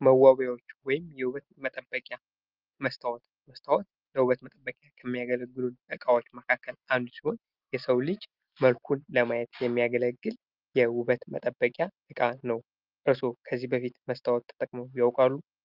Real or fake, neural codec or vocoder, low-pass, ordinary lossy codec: real; none; 5.4 kHz; Opus, 24 kbps